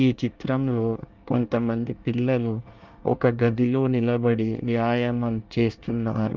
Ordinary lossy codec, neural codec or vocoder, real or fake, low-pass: Opus, 24 kbps; codec, 24 kHz, 1 kbps, SNAC; fake; 7.2 kHz